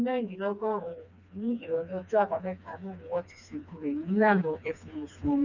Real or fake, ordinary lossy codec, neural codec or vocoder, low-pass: fake; none; codec, 16 kHz, 2 kbps, FreqCodec, smaller model; 7.2 kHz